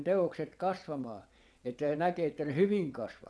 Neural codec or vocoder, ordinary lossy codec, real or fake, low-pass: vocoder, 22.05 kHz, 80 mel bands, WaveNeXt; none; fake; none